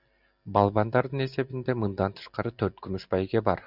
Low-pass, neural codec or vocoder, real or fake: 5.4 kHz; none; real